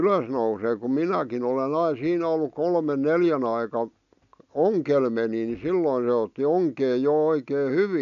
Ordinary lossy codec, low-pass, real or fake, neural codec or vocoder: MP3, 96 kbps; 7.2 kHz; real; none